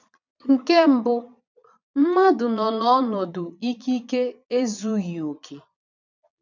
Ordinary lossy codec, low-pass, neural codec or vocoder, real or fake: none; 7.2 kHz; vocoder, 22.05 kHz, 80 mel bands, Vocos; fake